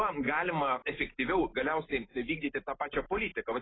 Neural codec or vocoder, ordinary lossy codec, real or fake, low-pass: none; AAC, 16 kbps; real; 7.2 kHz